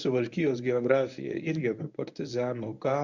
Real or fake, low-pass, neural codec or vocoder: fake; 7.2 kHz; codec, 24 kHz, 0.9 kbps, WavTokenizer, medium speech release version 1